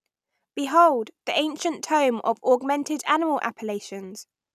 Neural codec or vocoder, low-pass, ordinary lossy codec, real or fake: none; 14.4 kHz; none; real